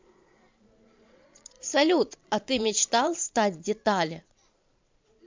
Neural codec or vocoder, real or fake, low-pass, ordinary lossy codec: vocoder, 22.05 kHz, 80 mel bands, Vocos; fake; 7.2 kHz; MP3, 64 kbps